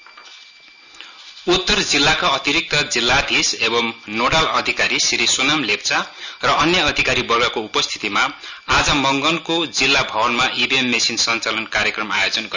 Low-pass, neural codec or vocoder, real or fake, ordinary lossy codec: 7.2 kHz; none; real; none